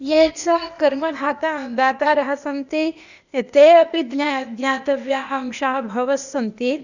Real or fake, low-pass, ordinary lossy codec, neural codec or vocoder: fake; 7.2 kHz; none; codec, 16 kHz, 0.8 kbps, ZipCodec